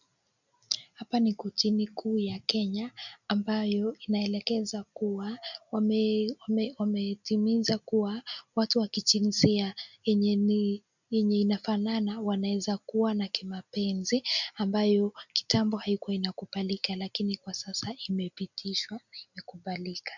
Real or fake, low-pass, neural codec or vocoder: real; 7.2 kHz; none